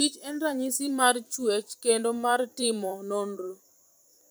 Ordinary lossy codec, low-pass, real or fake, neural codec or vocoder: none; none; fake; vocoder, 44.1 kHz, 128 mel bands every 512 samples, BigVGAN v2